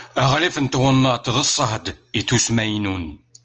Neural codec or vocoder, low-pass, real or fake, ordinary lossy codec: none; 7.2 kHz; real; Opus, 24 kbps